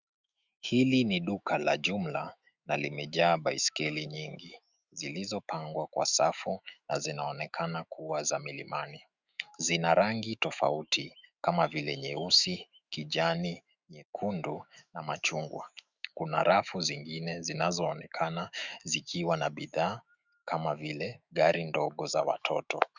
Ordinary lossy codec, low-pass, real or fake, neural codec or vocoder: Opus, 64 kbps; 7.2 kHz; fake; autoencoder, 48 kHz, 128 numbers a frame, DAC-VAE, trained on Japanese speech